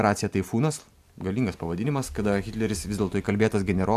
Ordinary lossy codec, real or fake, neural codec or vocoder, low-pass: AAC, 96 kbps; real; none; 14.4 kHz